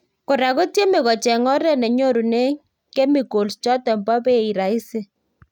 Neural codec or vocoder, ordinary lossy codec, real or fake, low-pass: none; none; real; 19.8 kHz